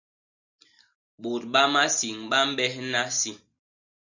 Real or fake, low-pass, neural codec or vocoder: real; 7.2 kHz; none